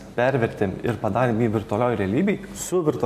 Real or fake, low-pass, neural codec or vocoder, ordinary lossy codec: real; 14.4 kHz; none; MP3, 64 kbps